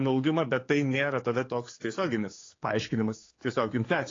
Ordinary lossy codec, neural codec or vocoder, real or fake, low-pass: AAC, 32 kbps; codec, 16 kHz, 4 kbps, X-Codec, HuBERT features, trained on general audio; fake; 7.2 kHz